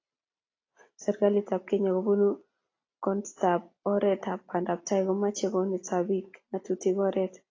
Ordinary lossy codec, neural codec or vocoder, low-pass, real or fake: AAC, 32 kbps; none; 7.2 kHz; real